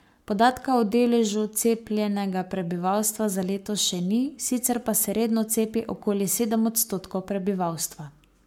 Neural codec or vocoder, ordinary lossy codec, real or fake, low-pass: codec, 44.1 kHz, 7.8 kbps, Pupu-Codec; MP3, 96 kbps; fake; 19.8 kHz